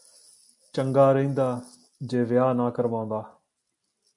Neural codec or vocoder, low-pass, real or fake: none; 10.8 kHz; real